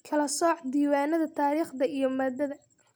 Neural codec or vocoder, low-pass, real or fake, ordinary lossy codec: none; none; real; none